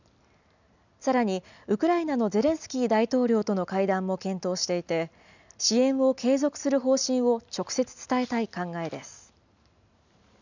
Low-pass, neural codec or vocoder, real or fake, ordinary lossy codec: 7.2 kHz; none; real; none